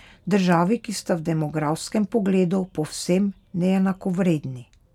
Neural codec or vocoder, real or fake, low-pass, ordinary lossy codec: none; real; 19.8 kHz; none